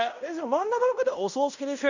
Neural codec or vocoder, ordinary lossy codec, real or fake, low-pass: codec, 16 kHz in and 24 kHz out, 0.9 kbps, LongCat-Audio-Codec, fine tuned four codebook decoder; none; fake; 7.2 kHz